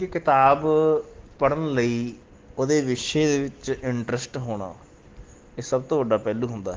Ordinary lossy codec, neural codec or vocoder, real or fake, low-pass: Opus, 16 kbps; none; real; 7.2 kHz